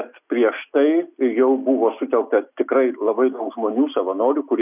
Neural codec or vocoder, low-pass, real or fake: none; 3.6 kHz; real